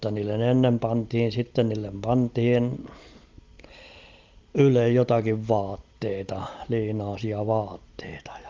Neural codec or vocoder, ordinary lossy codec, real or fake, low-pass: none; Opus, 32 kbps; real; 7.2 kHz